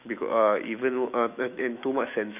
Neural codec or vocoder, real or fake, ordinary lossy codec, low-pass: autoencoder, 48 kHz, 128 numbers a frame, DAC-VAE, trained on Japanese speech; fake; none; 3.6 kHz